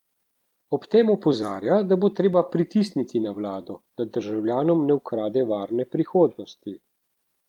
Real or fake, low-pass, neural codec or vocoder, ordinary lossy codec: fake; 19.8 kHz; vocoder, 44.1 kHz, 128 mel bands every 256 samples, BigVGAN v2; Opus, 32 kbps